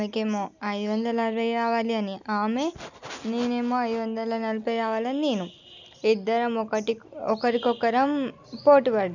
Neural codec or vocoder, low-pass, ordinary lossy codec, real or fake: none; 7.2 kHz; none; real